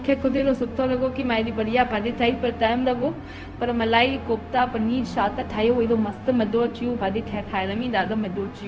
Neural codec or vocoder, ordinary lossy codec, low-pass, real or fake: codec, 16 kHz, 0.4 kbps, LongCat-Audio-Codec; none; none; fake